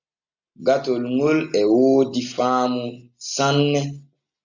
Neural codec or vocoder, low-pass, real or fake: none; 7.2 kHz; real